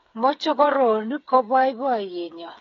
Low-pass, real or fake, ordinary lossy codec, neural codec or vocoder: 7.2 kHz; fake; AAC, 32 kbps; codec, 16 kHz, 8 kbps, FreqCodec, smaller model